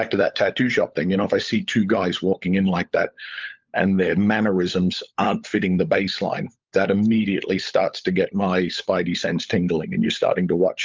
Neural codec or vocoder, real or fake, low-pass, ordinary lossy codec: codec, 16 kHz, 16 kbps, FunCodec, trained on LibriTTS, 50 frames a second; fake; 7.2 kHz; Opus, 24 kbps